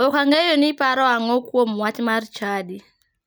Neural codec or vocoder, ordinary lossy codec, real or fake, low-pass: none; none; real; none